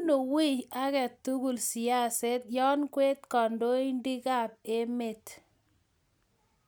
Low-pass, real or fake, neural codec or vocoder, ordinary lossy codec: none; real; none; none